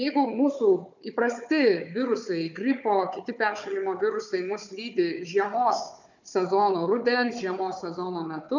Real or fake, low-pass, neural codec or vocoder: fake; 7.2 kHz; codec, 16 kHz, 4 kbps, FunCodec, trained on Chinese and English, 50 frames a second